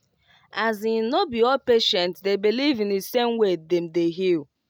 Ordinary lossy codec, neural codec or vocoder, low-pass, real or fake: none; none; 19.8 kHz; real